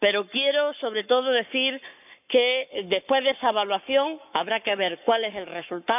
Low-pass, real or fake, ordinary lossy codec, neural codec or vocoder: 3.6 kHz; fake; none; codec, 44.1 kHz, 7.8 kbps, Pupu-Codec